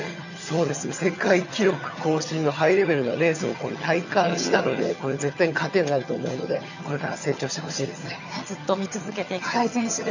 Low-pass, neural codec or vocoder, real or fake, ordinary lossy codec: 7.2 kHz; vocoder, 22.05 kHz, 80 mel bands, HiFi-GAN; fake; none